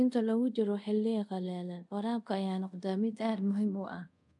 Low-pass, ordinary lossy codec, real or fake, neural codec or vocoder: 10.8 kHz; none; fake; codec, 24 kHz, 0.5 kbps, DualCodec